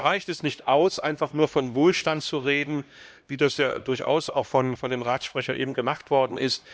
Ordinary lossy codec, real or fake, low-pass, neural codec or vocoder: none; fake; none; codec, 16 kHz, 1 kbps, X-Codec, HuBERT features, trained on LibriSpeech